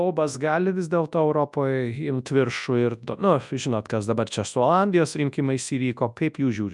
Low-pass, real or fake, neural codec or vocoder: 10.8 kHz; fake; codec, 24 kHz, 0.9 kbps, WavTokenizer, large speech release